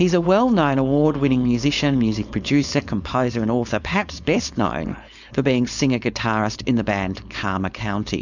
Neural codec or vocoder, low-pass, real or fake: codec, 16 kHz, 4.8 kbps, FACodec; 7.2 kHz; fake